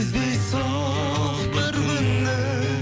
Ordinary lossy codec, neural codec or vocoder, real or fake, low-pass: none; none; real; none